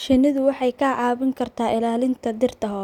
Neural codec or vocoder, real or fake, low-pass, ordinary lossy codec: none; real; 19.8 kHz; none